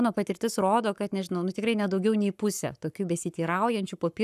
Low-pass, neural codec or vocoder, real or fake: 14.4 kHz; codec, 44.1 kHz, 7.8 kbps, Pupu-Codec; fake